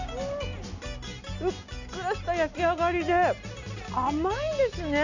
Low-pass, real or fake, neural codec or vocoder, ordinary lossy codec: 7.2 kHz; real; none; none